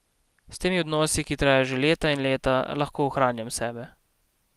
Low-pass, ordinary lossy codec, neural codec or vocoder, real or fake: 14.4 kHz; Opus, 32 kbps; none; real